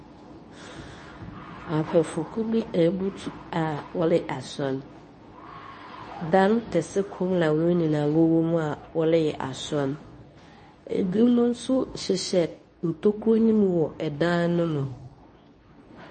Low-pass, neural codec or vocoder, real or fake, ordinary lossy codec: 10.8 kHz; codec, 24 kHz, 0.9 kbps, WavTokenizer, medium speech release version 2; fake; MP3, 32 kbps